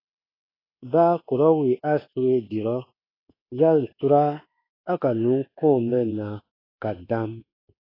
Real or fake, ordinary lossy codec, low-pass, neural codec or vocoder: fake; AAC, 24 kbps; 5.4 kHz; codec, 24 kHz, 1.2 kbps, DualCodec